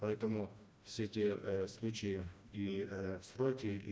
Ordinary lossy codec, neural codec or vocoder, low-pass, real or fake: none; codec, 16 kHz, 1 kbps, FreqCodec, smaller model; none; fake